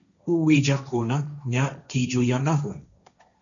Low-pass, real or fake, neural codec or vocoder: 7.2 kHz; fake; codec, 16 kHz, 1.1 kbps, Voila-Tokenizer